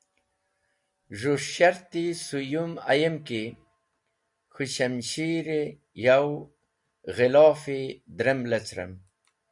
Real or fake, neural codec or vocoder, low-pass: real; none; 10.8 kHz